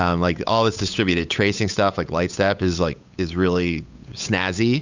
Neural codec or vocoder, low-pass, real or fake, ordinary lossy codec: codec, 16 kHz, 8 kbps, FunCodec, trained on Chinese and English, 25 frames a second; 7.2 kHz; fake; Opus, 64 kbps